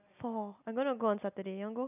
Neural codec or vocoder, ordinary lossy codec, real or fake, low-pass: none; none; real; 3.6 kHz